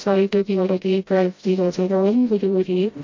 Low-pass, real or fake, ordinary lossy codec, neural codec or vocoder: 7.2 kHz; fake; MP3, 48 kbps; codec, 16 kHz, 0.5 kbps, FreqCodec, smaller model